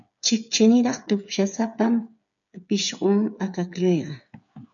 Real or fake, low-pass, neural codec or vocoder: fake; 7.2 kHz; codec, 16 kHz, 8 kbps, FreqCodec, smaller model